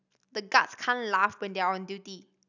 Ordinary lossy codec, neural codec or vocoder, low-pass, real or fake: none; none; 7.2 kHz; real